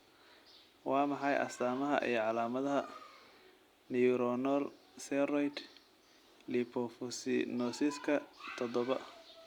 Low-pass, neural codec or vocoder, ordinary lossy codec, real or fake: 19.8 kHz; none; Opus, 64 kbps; real